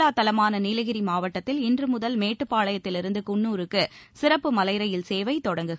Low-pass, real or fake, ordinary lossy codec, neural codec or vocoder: none; real; none; none